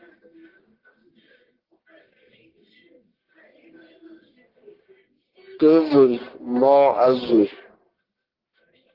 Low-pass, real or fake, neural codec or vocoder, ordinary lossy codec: 5.4 kHz; fake; codec, 44.1 kHz, 1.7 kbps, Pupu-Codec; Opus, 16 kbps